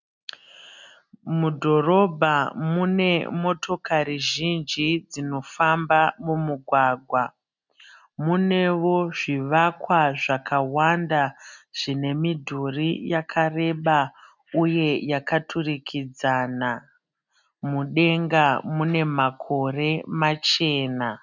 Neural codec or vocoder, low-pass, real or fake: none; 7.2 kHz; real